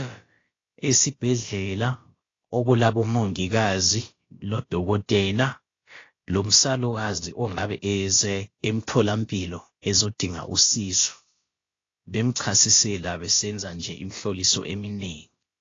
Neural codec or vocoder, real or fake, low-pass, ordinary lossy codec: codec, 16 kHz, about 1 kbps, DyCAST, with the encoder's durations; fake; 7.2 kHz; AAC, 32 kbps